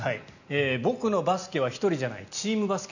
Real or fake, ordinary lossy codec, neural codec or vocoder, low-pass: real; none; none; 7.2 kHz